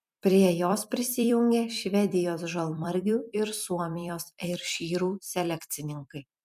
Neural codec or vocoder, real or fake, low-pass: vocoder, 44.1 kHz, 128 mel bands every 256 samples, BigVGAN v2; fake; 14.4 kHz